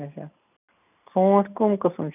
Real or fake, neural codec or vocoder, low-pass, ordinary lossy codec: real; none; 3.6 kHz; none